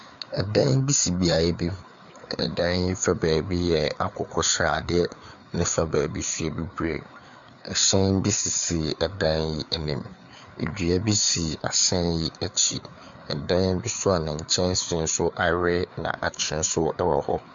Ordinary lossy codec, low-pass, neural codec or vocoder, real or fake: Opus, 64 kbps; 7.2 kHz; codec, 16 kHz, 4 kbps, FreqCodec, larger model; fake